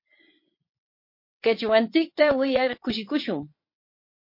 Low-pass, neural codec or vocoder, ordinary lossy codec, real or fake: 5.4 kHz; vocoder, 22.05 kHz, 80 mel bands, WaveNeXt; MP3, 32 kbps; fake